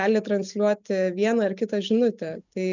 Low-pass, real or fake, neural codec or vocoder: 7.2 kHz; real; none